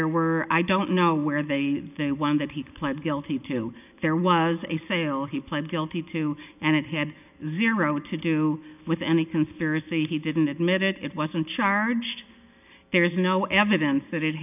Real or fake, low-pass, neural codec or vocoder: real; 3.6 kHz; none